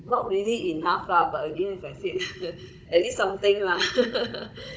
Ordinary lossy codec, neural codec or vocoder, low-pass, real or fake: none; codec, 16 kHz, 16 kbps, FunCodec, trained on Chinese and English, 50 frames a second; none; fake